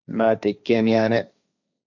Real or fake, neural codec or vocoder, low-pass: fake; codec, 16 kHz, 1.1 kbps, Voila-Tokenizer; 7.2 kHz